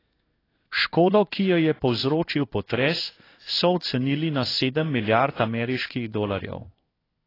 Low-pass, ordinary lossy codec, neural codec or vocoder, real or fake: 5.4 kHz; AAC, 24 kbps; codec, 16 kHz in and 24 kHz out, 1 kbps, XY-Tokenizer; fake